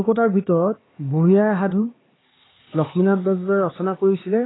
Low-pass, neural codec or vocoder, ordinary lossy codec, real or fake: 7.2 kHz; codec, 16 kHz, 4 kbps, X-Codec, HuBERT features, trained on LibriSpeech; AAC, 16 kbps; fake